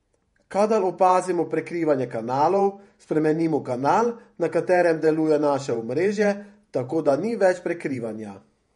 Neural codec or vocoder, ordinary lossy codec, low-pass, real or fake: vocoder, 48 kHz, 128 mel bands, Vocos; MP3, 48 kbps; 19.8 kHz; fake